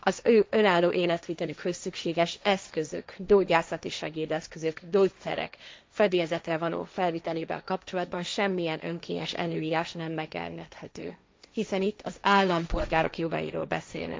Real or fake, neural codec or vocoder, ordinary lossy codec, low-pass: fake; codec, 16 kHz, 1.1 kbps, Voila-Tokenizer; none; none